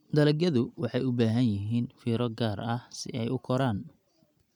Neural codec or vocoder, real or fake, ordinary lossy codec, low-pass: none; real; none; 19.8 kHz